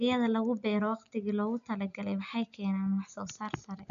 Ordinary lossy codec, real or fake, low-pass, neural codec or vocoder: none; real; 7.2 kHz; none